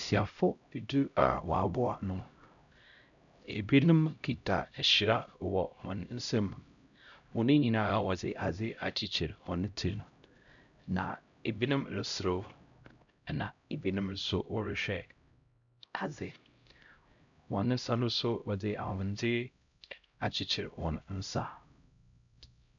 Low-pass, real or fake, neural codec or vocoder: 7.2 kHz; fake; codec, 16 kHz, 0.5 kbps, X-Codec, HuBERT features, trained on LibriSpeech